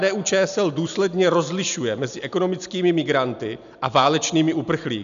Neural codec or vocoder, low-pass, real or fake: none; 7.2 kHz; real